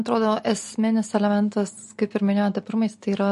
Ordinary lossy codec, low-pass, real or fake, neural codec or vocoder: MP3, 48 kbps; 14.4 kHz; real; none